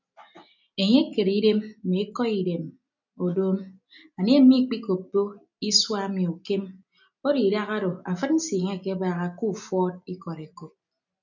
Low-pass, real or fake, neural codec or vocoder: 7.2 kHz; real; none